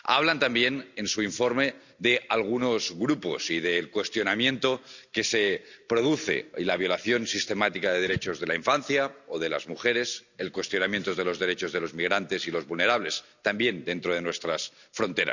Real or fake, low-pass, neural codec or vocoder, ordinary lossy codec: real; 7.2 kHz; none; none